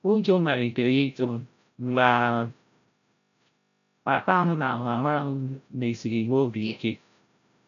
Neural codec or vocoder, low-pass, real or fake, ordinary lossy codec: codec, 16 kHz, 0.5 kbps, FreqCodec, larger model; 7.2 kHz; fake; none